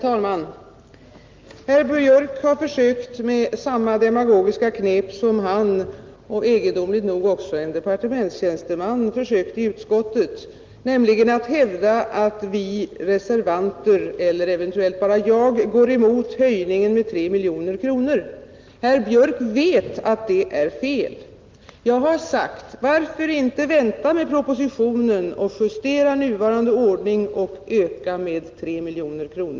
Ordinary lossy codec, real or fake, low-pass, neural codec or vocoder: Opus, 32 kbps; real; 7.2 kHz; none